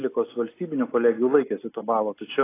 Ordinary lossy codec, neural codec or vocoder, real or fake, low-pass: AAC, 24 kbps; none; real; 3.6 kHz